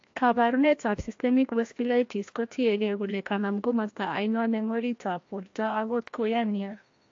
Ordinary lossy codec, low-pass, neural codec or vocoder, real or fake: AAC, 48 kbps; 7.2 kHz; codec, 16 kHz, 1 kbps, FreqCodec, larger model; fake